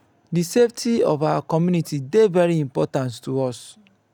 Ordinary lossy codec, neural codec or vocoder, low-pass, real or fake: none; none; 19.8 kHz; real